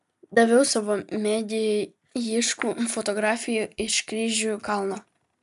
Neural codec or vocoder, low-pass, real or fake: none; 14.4 kHz; real